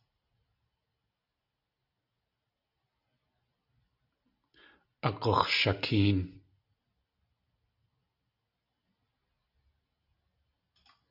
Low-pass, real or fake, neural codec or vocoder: 5.4 kHz; real; none